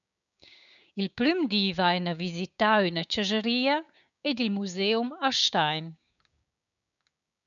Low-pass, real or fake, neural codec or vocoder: 7.2 kHz; fake; codec, 16 kHz, 6 kbps, DAC